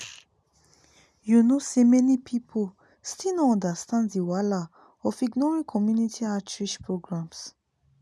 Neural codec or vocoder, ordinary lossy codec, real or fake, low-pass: none; none; real; none